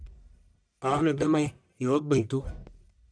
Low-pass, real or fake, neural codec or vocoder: 9.9 kHz; fake; codec, 44.1 kHz, 1.7 kbps, Pupu-Codec